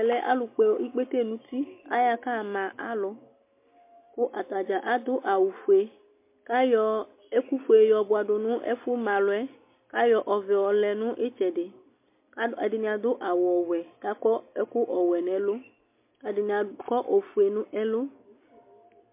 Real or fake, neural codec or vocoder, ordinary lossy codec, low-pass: real; none; MP3, 24 kbps; 3.6 kHz